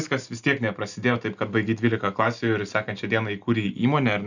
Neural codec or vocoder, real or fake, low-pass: none; real; 7.2 kHz